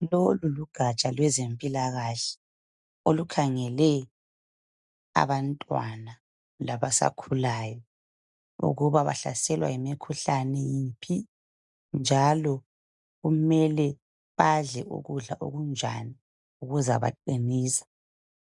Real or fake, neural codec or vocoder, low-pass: real; none; 10.8 kHz